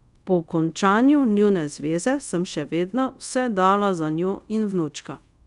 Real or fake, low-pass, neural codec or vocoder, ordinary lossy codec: fake; 10.8 kHz; codec, 24 kHz, 0.5 kbps, DualCodec; none